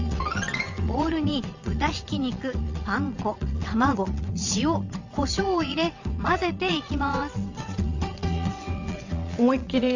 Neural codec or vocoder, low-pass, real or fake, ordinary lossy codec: vocoder, 22.05 kHz, 80 mel bands, WaveNeXt; 7.2 kHz; fake; Opus, 64 kbps